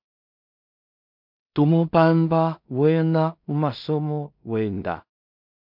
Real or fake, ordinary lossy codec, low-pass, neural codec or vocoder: fake; MP3, 48 kbps; 5.4 kHz; codec, 16 kHz in and 24 kHz out, 0.4 kbps, LongCat-Audio-Codec, two codebook decoder